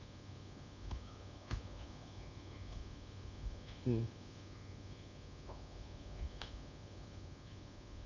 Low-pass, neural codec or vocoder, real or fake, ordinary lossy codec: 7.2 kHz; codec, 24 kHz, 1.2 kbps, DualCodec; fake; none